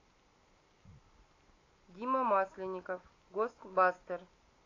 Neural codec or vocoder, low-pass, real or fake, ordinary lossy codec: none; 7.2 kHz; real; AAC, 32 kbps